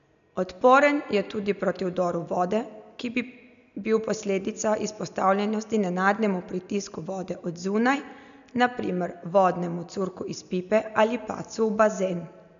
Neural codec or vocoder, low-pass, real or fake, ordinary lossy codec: none; 7.2 kHz; real; none